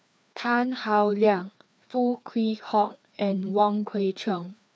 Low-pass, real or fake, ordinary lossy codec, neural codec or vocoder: none; fake; none; codec, 16 kHz, 2 kbps, FreqCodec, larger model